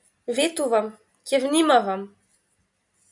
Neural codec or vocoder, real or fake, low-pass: none; real; 10.8 kHz